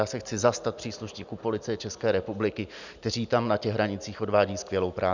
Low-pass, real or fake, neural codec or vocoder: 7.2 kHz; real; none